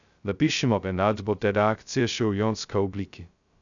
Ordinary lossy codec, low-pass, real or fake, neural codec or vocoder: none; 7.2 kHz; fake; codec, 16 kHz, 0.2 kbps, FocalCodec